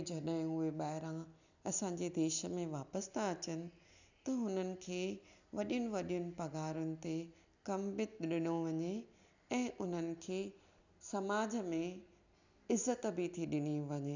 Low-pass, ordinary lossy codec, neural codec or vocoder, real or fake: 7.2 kHz; none; none; real